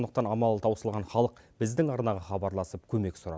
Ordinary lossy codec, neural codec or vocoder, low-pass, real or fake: none; none; none; real